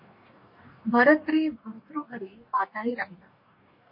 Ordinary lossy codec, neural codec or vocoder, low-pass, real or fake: MP3, 32 kbps; codec, 44.1 kHz, 2.6 kbps, DAC; 5.4 kHz; fake